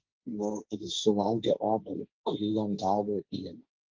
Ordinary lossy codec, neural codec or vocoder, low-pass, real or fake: Opus, 32 kbps; codec, 16 kHz, 1.1 kbps, Voila-Tokenizer; 7.2 kHz; fake